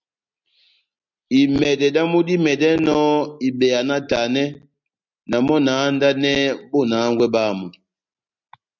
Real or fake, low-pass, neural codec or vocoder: real; 7.2 kHz; none